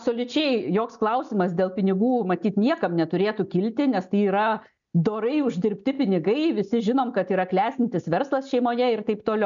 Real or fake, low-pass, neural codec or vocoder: real; 7.2 kHz; none